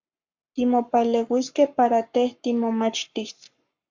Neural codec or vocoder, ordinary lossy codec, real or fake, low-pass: codec, 44.1 kHz, 7.8 kbps, Pupu-Codec; MP3, 64 kbps; fake; 7.2 kHz